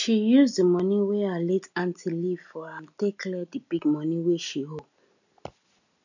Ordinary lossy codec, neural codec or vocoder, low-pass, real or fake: none; none; 7.2 kHz; real